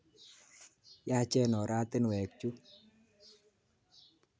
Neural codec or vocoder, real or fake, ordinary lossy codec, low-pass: none; real; none; none